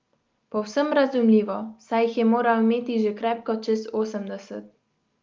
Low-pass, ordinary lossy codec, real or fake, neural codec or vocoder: 7.2 kHz; Opus, 32 kbps; real; none